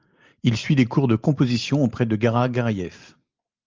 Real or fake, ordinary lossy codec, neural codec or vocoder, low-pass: real; Opus, 24 kbps; none; 7.2 kHz